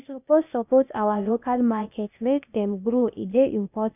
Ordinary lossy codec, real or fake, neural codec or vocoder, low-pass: none; fake; codec, 16 kHz, 0.8 kbps, ZipCodec; 3.6 kHz